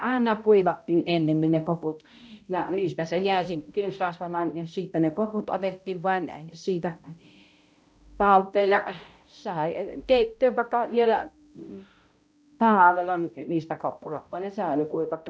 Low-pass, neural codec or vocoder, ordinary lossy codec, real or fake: none; codec, 16 kHz, 0.5 kbps, X-Codec, HuBERT features, trained on balanced general audio; none; fake